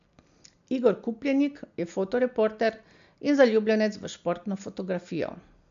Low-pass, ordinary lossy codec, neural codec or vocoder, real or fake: 7.2 kHz; MP3, 64 kbps; none; real